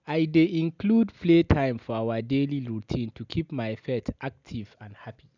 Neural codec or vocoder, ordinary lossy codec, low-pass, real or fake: none; none; 7.2 kHz; real